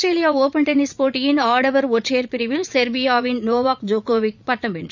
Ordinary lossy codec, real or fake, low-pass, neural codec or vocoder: none; fake; 7.2 kHz; vocoder, 44.1 kHz, 80 mel bands, Vocos